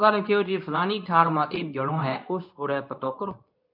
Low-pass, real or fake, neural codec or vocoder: 5.4 kHz; fake; codec, 24 kHz, 0.9 kbps, WavTokenizer, medium speech release version 2